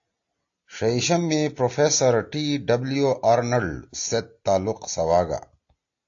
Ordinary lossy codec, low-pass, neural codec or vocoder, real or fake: AAC, 48 kbps; 7.2 kHz; none; real